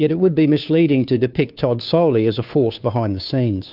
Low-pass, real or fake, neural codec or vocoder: 5.4 kHz; fake; codec, 16 kHz, 2 kbps, FunCodec, trained on Chinese and English, 25 frames a second